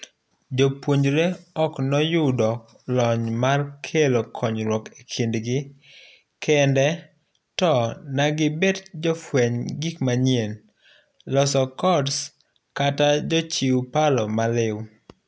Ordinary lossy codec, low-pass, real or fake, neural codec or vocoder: none; none; real; none